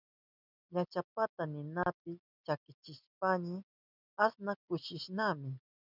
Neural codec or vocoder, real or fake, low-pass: none; real; 5.4 kHz